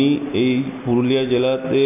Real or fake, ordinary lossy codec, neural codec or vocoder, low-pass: real; none; none; 3.6 kHz